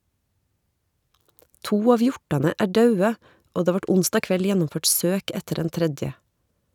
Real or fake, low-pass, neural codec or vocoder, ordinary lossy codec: real; 19.8 kHz; none; none